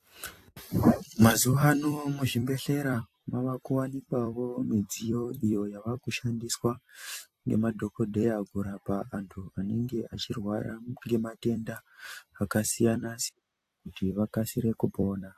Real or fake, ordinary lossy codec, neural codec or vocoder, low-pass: fake; AAC, 64 kbps; vocoder, 44.1 kHz, 128 mel bands every 256 samples, BigVGAN v2; 14.4 kHz